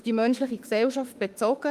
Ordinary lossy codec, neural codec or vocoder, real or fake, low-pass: Opus, 32 kbps; autoencoder, 48 kHz, 32 numbers a frame, DAC-VAE, trained on Japanese speech; fake; 14.4 kHz